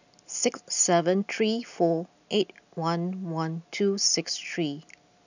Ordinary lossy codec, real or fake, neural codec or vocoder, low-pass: none; real; none; 7.2 kHz